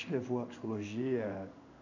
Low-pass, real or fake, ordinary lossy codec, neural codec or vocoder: 7.2 kHz; fake; none; codec, 16 kHz in and 24 kHz out, 1 kbps, XY-Tokenizer